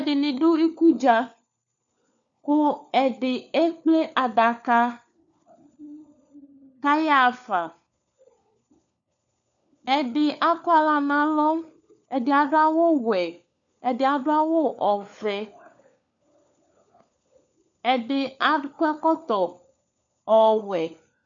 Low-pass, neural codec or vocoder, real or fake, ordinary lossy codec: 7.2 kHz; codec, 16 kHz, 4 kbps, FunCodec, trained on LibriTTS, 50 frames a second; fake; MP3, 96 kbps